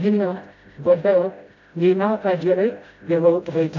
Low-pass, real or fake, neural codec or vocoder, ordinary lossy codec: 7.2 kHz; fake; codec, 16 kHz, 0.5 kbps, FreqCodec, smaller model; AAC, 48 kbps